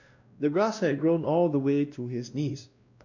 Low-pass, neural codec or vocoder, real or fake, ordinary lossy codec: 7.2 kHz; codec, 16 kHz, 1 kbps, X-Codec, WavLM features, trained on Multilingual LibriSpeech; fake; none